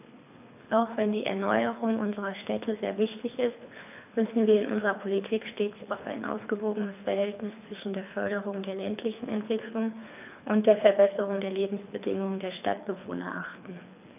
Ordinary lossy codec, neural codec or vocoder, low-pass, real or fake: none; codec, 24 kHz, 3 kbps, HILCodec; 3.6 kHz; fake